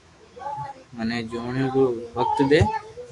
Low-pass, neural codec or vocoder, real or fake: 10.8 kHz; autoencoder, 48 kHz, 128 numbers a frame, DAC-VAE, trained on Japanese speech; fake